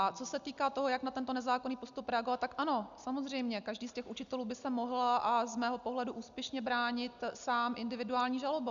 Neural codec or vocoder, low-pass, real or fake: none; 7.2 kHz; real